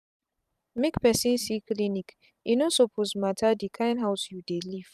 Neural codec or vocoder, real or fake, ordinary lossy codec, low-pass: vocoder, 44.1 kHz, 128 mel bands every 512 samples, BigVGAN v2; fake; none; 14.4 kHz